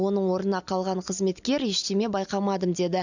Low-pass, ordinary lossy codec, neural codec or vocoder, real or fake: 7.2 kHz; none; none; real